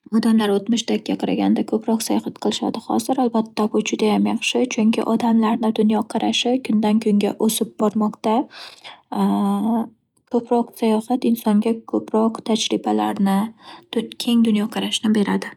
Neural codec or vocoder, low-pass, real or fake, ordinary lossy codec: none; 19.8 kHz; real; none